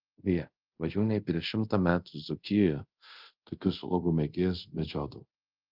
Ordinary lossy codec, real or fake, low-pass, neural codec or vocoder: Opus, 32 kbps; fake; 5.4 kHz; codec, 24 kHz, 0.5 kbps, DualCodec